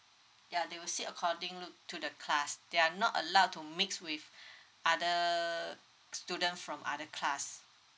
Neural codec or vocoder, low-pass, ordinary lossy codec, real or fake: none; none; none; real